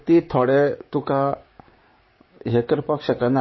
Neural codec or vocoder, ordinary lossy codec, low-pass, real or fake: codec, 16 kHz, 6 kbps, DAC; MP3, 24 kbps; 7.2 kHz; fake